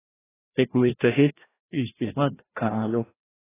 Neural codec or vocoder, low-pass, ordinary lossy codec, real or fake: codec, 16 kHz, 1 kbps, FreqCodec, larger model; 3.6 kHz; AAC, 16 kbps; fake